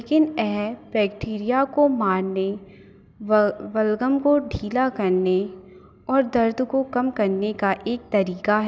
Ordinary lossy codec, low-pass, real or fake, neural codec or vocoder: none; none; real; none